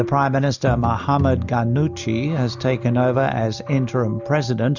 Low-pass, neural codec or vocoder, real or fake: 7.2 kHz; none; real